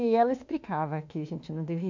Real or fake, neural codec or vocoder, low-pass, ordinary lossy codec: fake; autoencoder, 48 kHz, 32 numbers a frame, DAC-VAE, trained on Japanese speech; 7.2 kHz; MP3, 64 kbps